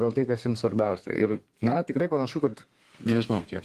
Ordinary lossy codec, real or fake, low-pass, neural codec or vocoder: Opus, 24 kbps; fake; 14.4 kHz; codec, 32 kHz, 1.9 kbps, SNAC